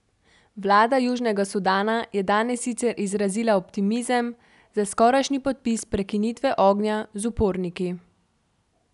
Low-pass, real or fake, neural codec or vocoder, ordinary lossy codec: 10.8 kHz; real; none; none